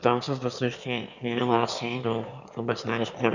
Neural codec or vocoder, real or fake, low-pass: autoencoder, 22.05 kHz, a latent of 192 numbers a frame, VITS, trained on one speaker; fake; 7.2 kHz